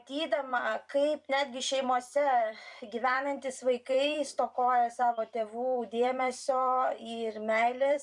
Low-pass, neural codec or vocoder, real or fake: 10.8 kHz; vocoder, 44.1 kHz, 128 mel bands every 256 samples, BigVGAN v2; fake